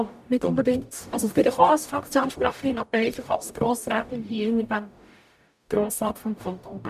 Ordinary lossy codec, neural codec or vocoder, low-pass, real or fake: none; codec, 44.1 kHz, 0.9 kbps, DAC; 14.4 kHz; fake